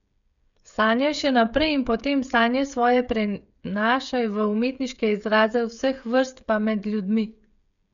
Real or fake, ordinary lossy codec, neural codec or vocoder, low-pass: fake; none; codec, 16 kHz, 8 kbps, FreqCodec, smaller model; 7.2 kHz